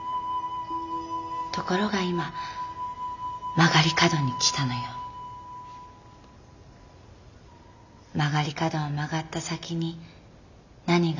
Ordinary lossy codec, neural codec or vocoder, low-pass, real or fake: none; none; 7.2 kHz; real